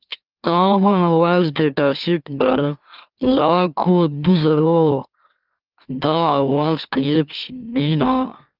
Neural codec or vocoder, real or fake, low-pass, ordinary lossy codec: autoencoder, 44.1 kHz, a latent of 192 numbers a frame, MeloTTS; fake; 5.4 kHz; Opus, 24 kbps